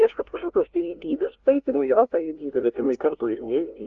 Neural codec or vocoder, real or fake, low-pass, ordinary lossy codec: codec, 16 kHz, 0.5 kbps, FunCodec, trained on LibriTTS, 25 frames a second; fake; 7.2 kHz; Opus, 32 kbps